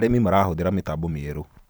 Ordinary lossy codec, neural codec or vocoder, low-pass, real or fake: none; vocoder, 44.1 kHz, 128 mel bands every 512 samples, BigVGAN v2; none; fake